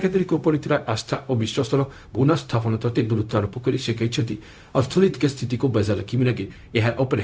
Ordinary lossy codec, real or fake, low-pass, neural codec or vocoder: none; fake; none; codec, 16 kHz, 0.4 kbps, LongCat-Audio-Codec